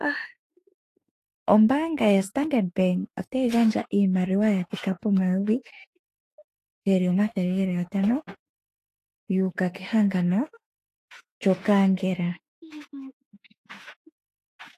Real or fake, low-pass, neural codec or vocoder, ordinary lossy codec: fake; 14.4 kHz; autoencoder, 48 kHz, 32 numbers a frame, DAC-VAE, trained on Japanese speech; AAC, 48 kbps